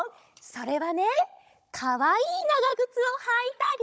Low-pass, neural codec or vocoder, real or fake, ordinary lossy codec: none; codec, 16 kHz, 16 kbps, FunCodec, trained on Chinese and English, 50 frames a second; fake; none